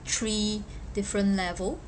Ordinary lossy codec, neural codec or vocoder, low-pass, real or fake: none; none; none; real